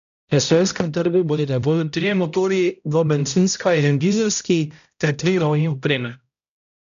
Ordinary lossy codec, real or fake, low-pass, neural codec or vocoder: none; fake; 7.2 kHz; codec, 16 kHz, 0.5 kbps, X-Codec, HuBERT features, trained on balanced general audio